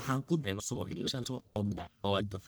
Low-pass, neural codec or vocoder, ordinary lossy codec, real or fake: none; codec, 44.1 kHz, 1.7 kbps, Pupu-Codec; none; fake